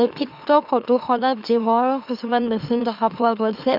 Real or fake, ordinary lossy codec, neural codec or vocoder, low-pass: fake; none; autoencoder, 44.1 kHz, a latent of 192 numbers a frame, MeloTTS; 5.4 kHz